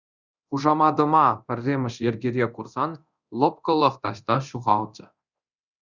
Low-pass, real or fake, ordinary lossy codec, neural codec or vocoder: 7.2 kHz; fake; Opus, 64 kbps; codec, 24 kHz, 0.9 kbps, DualCodec